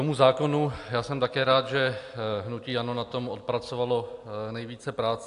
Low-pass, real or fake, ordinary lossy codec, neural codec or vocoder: 10.8 kHz; real; AAC, 64 kbps; none